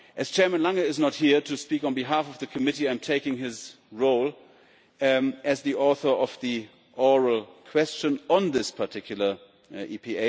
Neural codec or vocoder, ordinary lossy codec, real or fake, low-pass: none; none; real; none